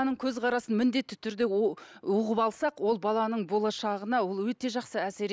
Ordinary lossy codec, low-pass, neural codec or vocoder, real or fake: none; none; none; real